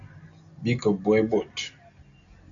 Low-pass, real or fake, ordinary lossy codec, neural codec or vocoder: 7.2 kHz; real; Opus, 64 kbps; none